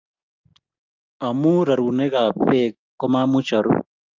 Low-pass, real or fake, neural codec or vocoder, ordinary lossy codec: 7.2 kHz; real; none; Opus, 24 kbps